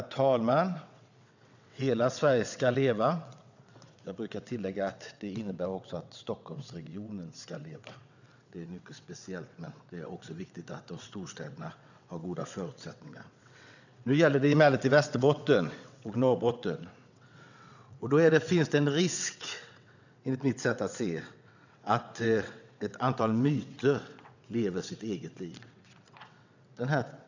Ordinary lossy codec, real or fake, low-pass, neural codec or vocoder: none; fake; 7.2 kHz; vocoder, 22.05 kHz, 80 mel bands, WaveNeXt